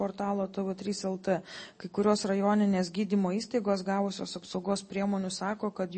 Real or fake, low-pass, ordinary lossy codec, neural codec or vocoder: real; 9.9 kHz; MP3, 32 kbps; none